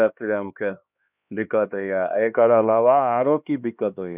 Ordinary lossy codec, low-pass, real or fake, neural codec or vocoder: none; 3.6 kHz; fake; codec, 16 kHz, 2 kbps, X-Codec, HuBERT features, trained on LibriSpeech